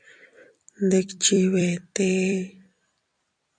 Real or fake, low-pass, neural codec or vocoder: fake; 9.9 kHz; vocoder, 44.1 kHz, 128 mel bands every 256 samples, BigVGAN v2